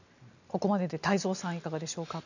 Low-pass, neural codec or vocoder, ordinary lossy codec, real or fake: 7.2 kHz; none; none; real